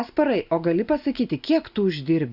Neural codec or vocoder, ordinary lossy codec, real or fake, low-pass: none; Opus, 64 kbps; real; 5.4 kHz